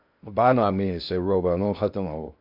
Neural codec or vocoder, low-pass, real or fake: codec, 16 kHz in and 24 kHz out, 0.6 kbps, FocalCodec, streaming, 2048 codes; 5.4 kHz; fake